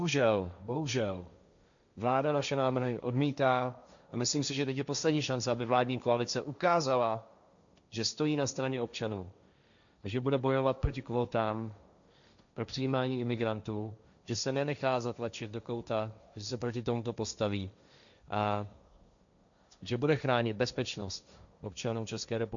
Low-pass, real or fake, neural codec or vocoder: 7.2 kHz; fake; codec, 16 kHz, 1.1 kbps, Voila-Tokenizer